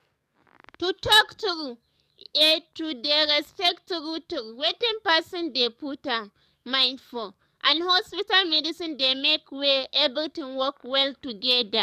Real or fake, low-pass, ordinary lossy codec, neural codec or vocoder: fake; 14.4 kHz; none; codec, 44.1 kHz, 7.8 kbps, DAC